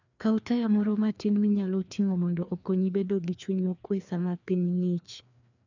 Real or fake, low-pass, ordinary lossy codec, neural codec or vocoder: fake; 7.2 kHz; none; codec, 16 kHz, 2 kbps, FreqCodec, larger model